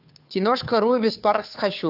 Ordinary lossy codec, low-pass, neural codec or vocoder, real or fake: AAC, 48 kbps; 5.4 kHz; codec, 16 kHz, 2 kbps, FunCodec, trained on Chinese and English, 25 frames a second; fake